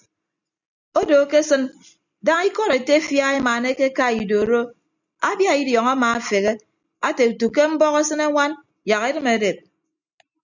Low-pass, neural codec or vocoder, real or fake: 7.2 kHz; none; real